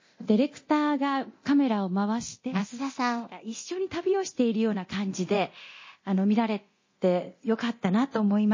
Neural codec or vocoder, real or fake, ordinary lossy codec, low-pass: codec, 24 kHz, 0.9 kbps, DualCodec; fake; MP3, 32 kbps; 7.2 kHz